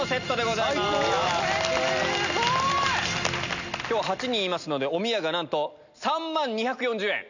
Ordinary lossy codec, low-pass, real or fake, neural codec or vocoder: none; 7.2 kHz; real; none